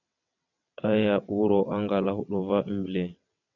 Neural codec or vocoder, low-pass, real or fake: vocoder, 22.05 kHz, 80 mel bands, WaveNeXt; 7.2 kHz; fake